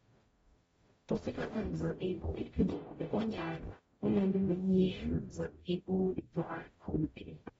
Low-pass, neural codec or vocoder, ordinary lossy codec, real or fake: 19.8 kHz; codec, 44.1 kHz, 0.9 kbps, DAC; AAC, 24 kbps; fake